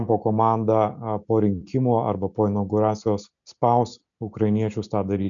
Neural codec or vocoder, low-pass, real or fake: none; 7.2 kHz; real